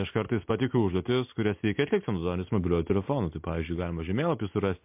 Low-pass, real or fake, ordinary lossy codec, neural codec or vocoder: 3.6 kHz; real; MP3, 32 kbps; none